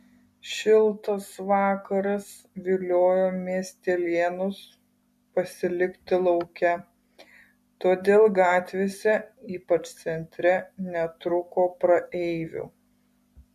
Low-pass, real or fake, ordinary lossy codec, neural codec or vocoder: 14.4 kHz; real; MP3, 64 kbps; none